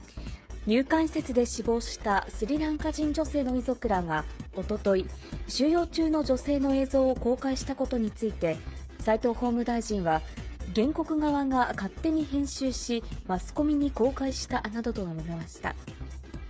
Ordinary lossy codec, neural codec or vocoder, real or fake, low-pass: none; codec, 16 kHz, 8 kbps, FreqCodec, smaller model; fake; none